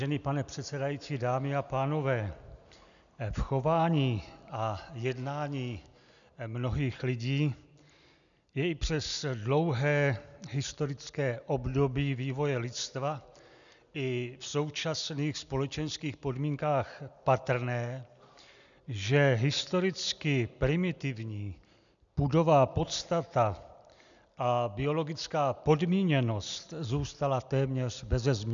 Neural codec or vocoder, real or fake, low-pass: none; real; 7.2 kHz